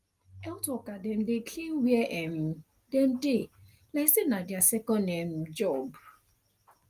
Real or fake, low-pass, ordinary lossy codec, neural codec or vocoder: real; 14.4 kHz; Opus, 32 kbps; none